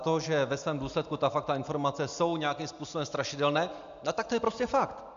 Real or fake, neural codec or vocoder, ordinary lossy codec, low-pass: real; none; MP3, 64 kbps; 7.2 kHz